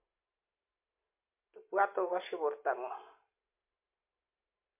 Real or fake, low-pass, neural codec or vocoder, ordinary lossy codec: real; 3.6 kHz; none; MP3, 24 kbps